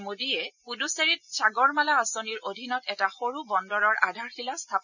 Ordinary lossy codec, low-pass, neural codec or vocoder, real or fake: none; 7.2 kHz; none; real